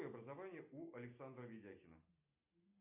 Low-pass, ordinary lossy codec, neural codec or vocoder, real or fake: 3.6 kHz; MP3, 32 kbps; none; real